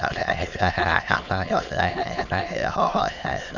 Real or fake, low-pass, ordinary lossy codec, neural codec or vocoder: fake; 7.2 kHz; none; autoencoder, 22.05 kHz, a latent of 192 numbers a frame, VITS, trained on many speakers